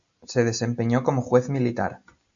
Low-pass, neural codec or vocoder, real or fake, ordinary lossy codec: 7.2 kHz; none; real; MP3, 64 kbps